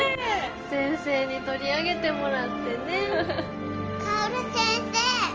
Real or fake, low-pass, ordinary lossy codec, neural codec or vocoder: real; 7.2 kHz; Opus, 24 kbps; none